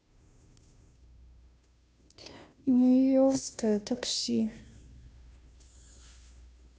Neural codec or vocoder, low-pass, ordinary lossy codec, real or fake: codec, 16 kHz, 0.5 kbps, FunCodec, trained on Chinese and English, 25 frames a second; none; none; fake